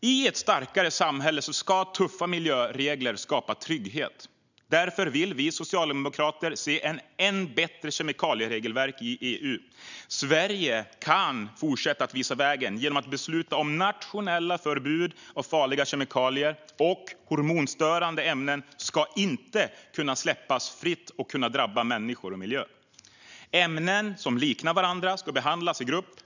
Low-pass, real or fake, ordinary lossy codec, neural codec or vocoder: 7.2 kHz; real; none; none